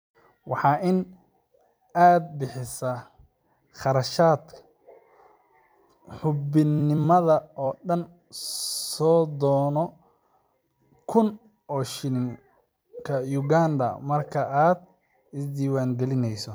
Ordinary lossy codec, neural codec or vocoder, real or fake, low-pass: none; vocoder, 44.1 kHz, 128 mel bands every 256 samples, BigVGAN v2; fake; none